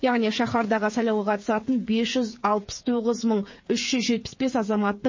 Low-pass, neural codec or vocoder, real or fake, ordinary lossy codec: 7.2 kHz; codec, 16 kHz, 8 kbps, FreqCodec, smaller model; fake; MP3, 32 kbps